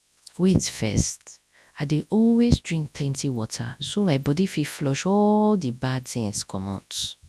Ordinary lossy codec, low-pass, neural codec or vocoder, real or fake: none; none; codec, 24 kHz, 0.9 kbps, WavTokenizer, large speech release; fake